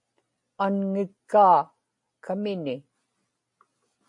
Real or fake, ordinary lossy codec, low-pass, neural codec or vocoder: real; MP3, 48 kbps; 10.8 kHz; none